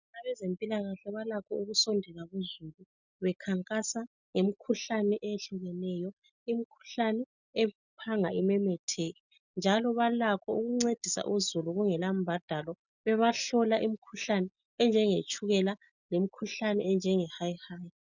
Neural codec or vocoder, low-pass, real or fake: none; 7.2 kHz; real